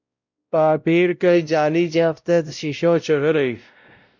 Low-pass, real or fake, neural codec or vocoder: 7.2 kHz; fake; codec, 16 kHz, 0.5 kbps, X-Codec, WavLM features, trained on Multilingual LibriSpeech